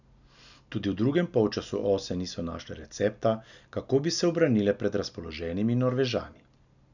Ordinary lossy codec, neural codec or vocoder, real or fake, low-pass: none; none; real; 7.2 kHz